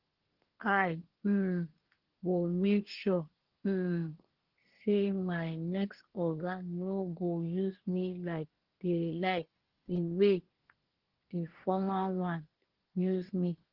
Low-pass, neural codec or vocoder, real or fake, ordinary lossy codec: 5.4 kHz; codec, 24 kHz, 1 kbps, SNAC; fake; Opus, 16 kbps